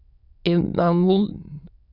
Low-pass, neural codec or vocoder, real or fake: 5.4 kHz; autoencoder, 22.05 kHz, a latent of 192 numbers a frame, VITS, trained on many speakers; fake